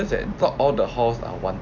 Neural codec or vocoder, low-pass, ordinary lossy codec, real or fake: none; 7.2 kHz; none; real